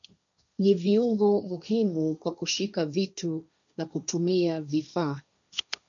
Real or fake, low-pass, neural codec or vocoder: fake; 7.2 kHz; codec, 16 kHz, 1.1 kbps, Voila-Tokenizer